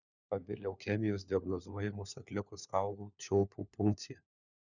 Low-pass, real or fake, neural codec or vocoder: 7.2 kHz; fake; codec, 16 kHz, 4 kbps, FunCodec, trained on LibriTTS, 50 frames a second